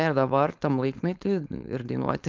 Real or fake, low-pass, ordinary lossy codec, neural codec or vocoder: fake; 7.2 kHz; Opus, 24 kbps; codec, 16 kHz, 4.8 kbps, FACodec